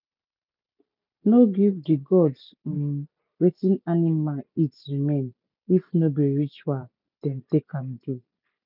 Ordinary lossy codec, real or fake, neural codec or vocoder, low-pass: none; real; none; 5.4 kHz